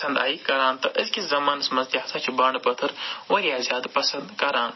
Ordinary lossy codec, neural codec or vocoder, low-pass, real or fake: MP3, 24 kbps; none; 7.2 kHz; real